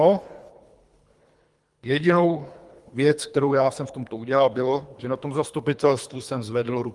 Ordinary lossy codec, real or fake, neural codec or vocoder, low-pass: Opus, 32 kbps; fake; codec, 24 kHz, 3 kbps, HILCodec; 10.8 kHz